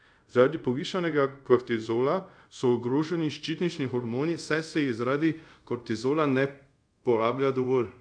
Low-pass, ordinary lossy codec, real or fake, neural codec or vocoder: 9.9 kHz; AAC, 64 kbps; fake; codec, 24 kHz, 0.5 kbps, DualCodec